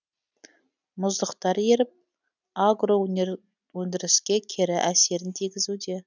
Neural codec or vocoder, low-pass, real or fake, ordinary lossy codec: none; 7.2 kHz; real; none